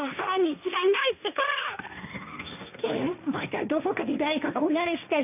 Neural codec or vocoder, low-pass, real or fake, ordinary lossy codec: codec, 16 kHz, 1.1 kbps, Voila-Tokenizer; 3.6 kHz; fake; none